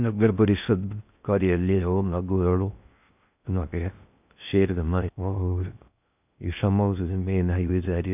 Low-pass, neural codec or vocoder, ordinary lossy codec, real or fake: 3.6 kHz; codec, 16 kHz in and 24 kHz out, 0.6 kbps, FocalCodec, streaming, 4096 codes; none; fake